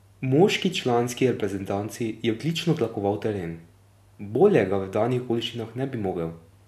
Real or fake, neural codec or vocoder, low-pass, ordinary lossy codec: real; none; 14.4 kHz; none